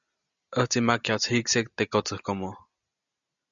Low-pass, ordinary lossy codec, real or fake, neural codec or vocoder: 7.2 kHz; MP3, 96 kbps; real; none